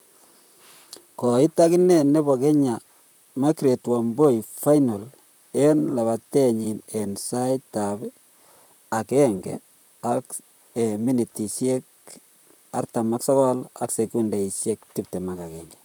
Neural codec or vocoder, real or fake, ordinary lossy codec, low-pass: vocoder, 44.1 kHz, 128 mel bands, Pupu-Vocoder; fake; none; none